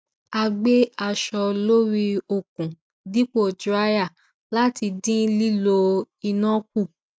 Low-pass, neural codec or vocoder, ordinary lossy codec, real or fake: none; none; none; real